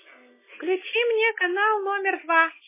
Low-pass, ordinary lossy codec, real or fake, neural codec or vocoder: 3.6 kHz; MP3, 16 kbps; real; none